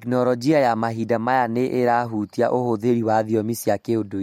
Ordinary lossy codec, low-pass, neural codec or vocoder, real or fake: MP3, 64 kbps; 19.8 kHz; none; real